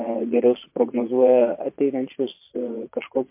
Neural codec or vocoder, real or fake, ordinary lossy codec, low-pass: vocoder, 22.05 kHz, 80 mel bands, Vocos; fake; MP3, 24 kbps; 3.6 kHz